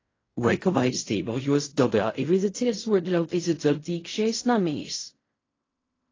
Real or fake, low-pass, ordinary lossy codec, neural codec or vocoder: fake; 7.2 kHz; AAC, 32 kbps; codec, 16 kHz in and 24 kHz out, 0.4 kbps, LongCat-Audio-Codec, fine tuned four codebook decoder